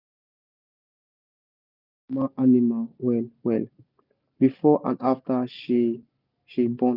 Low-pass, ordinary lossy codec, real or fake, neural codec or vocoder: 5.4 kHz; none; real; none